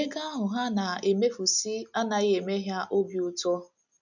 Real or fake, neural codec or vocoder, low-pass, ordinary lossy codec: real; none; 7.2 kHz; none